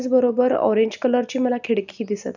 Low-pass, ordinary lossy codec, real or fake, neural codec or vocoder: 7.2 kHz; none; real; none